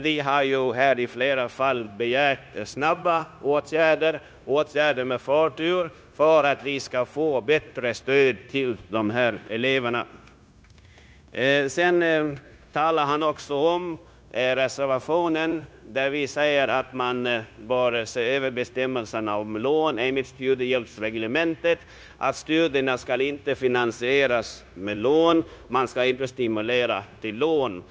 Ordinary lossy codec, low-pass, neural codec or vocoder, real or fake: none; none; codec, 16 kHz, 0.9 kbps, LongCat-Audio-Codec; fake